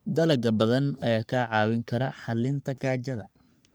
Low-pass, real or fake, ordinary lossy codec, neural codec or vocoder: none; fake; none; codec, 44.1 kHz, 3.4 kbps, Pupu-Codec